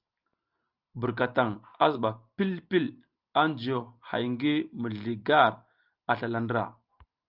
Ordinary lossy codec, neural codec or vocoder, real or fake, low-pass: Opus, 24 kbps; none; real; 5.4 kHz